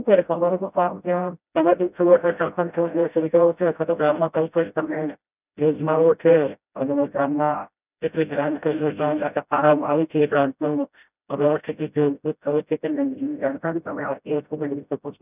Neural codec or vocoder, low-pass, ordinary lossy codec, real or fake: codec, 16 kHz, 0.5 kbps, FreqCodec, smaller model; 3.6 kHz; none; fake